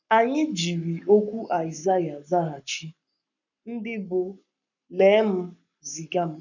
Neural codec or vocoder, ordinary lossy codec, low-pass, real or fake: codec, 44.1 kHz, 7.8 kbps, Pupu-Codec; none; 7.2 kHz; fake